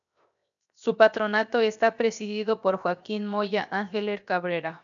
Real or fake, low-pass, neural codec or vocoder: fake; 7.2 kHz; codec, 16 kHz, 0.7 kbps, FocalCodec